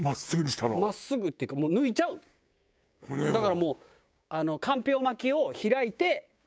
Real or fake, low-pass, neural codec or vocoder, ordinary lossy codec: fake; none; codec, 16 kHz, 6 kbps, DAC; none